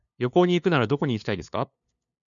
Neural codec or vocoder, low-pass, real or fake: codec, 16 kHz, 2 kbps, FunCodec, trained on LibriTTS, 25 frames a second; 7.2 kHz; fake